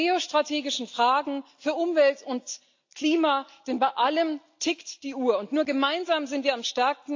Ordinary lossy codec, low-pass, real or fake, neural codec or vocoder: AAC, 48 kbps; 7.2 kHz; real; none